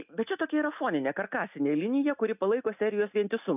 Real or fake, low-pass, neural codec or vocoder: real; 3.6 kHz; none